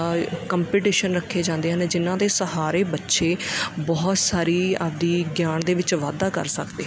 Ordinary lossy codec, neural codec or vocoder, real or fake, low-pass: none; none; real; none